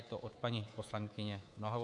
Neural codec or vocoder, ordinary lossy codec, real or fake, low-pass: codec, 24 kHz, 3.1 kbps, DualCodec; MP3, 64 kbps; fake; 10.8 kHz